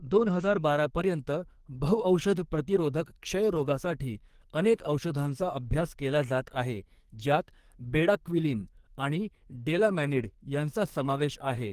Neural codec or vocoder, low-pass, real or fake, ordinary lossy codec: codec, 44.1 kHz, 2.6 kbps, SNAC; 14.4 kHz; fake; Opus, 24 kbps